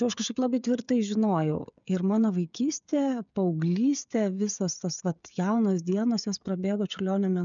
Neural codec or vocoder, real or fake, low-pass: codec, 16 kHz, 16 kbps, FreqCodec, smaller model; fake; 7.2 kHz